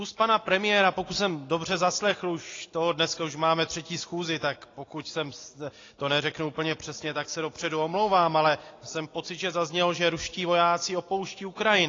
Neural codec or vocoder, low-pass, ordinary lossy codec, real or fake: none; 7.2 kHz; AAC, 32 kbps; real